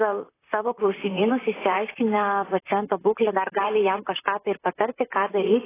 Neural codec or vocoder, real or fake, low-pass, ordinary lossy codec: vocoder, 44.1 kHz, 128 mel bands, Pupu-Vocoder; fake; 3.6 kHz; AAC, 16 kbps